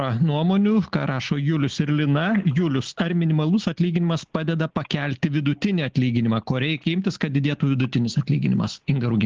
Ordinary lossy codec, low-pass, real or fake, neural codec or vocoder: Opus, 16 kbps; 7.2 kHz; real; none